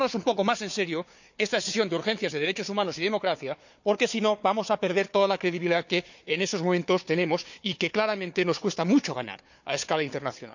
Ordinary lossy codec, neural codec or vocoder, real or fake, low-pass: none; codec, 16 kHz, 4 kbps, FunCodec, trained on Chinese and English, 50 frames a second; fake; 7.2 kHz